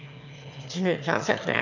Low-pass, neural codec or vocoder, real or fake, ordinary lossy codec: 7.2 kHz; autoencoder, 22.05 kHz, a latent of 192 numbers a frame, VITS, trained on one speaker; fake; none